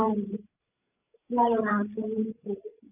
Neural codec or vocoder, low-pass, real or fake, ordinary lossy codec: codec, 16 kHz, 16 kbps, FreqCodec, larger model; 3.6 kHz; fake; none